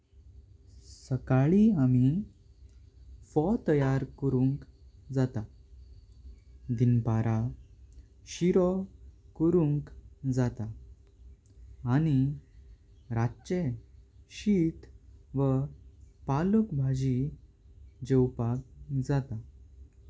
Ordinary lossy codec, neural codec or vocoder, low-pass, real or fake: none; none; none; real